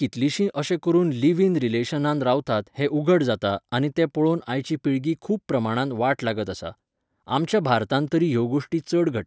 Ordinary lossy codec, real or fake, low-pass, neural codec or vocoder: none; real; none; none